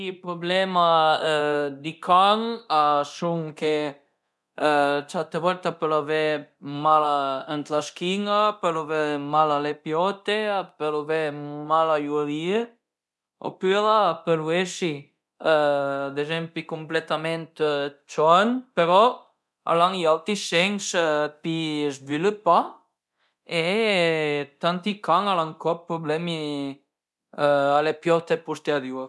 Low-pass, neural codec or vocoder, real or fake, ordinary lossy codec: none; codec, 24 kHz, 0.9 kbps, DualCodec; fake; none